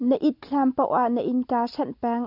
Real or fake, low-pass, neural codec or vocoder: real; 5.4 kHz; none